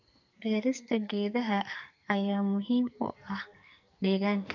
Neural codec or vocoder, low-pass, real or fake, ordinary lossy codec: codec, 44.1 kHz, 2.6 kbps, SNAC; 7.2 kHz; fake; none